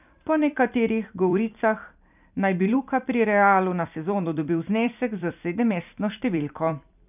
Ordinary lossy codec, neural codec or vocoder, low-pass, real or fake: none; vocoder, 44.1 kHz, 128 mel bands every 256 samples, BigVGAN v2; 3.6 kHz; fake